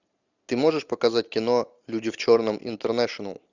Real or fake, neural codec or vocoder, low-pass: real; none; 7.2 kHz